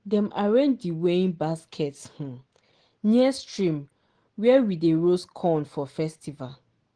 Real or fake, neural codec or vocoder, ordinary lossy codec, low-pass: real; none; Opus, 16 kbps; 9.9 kHz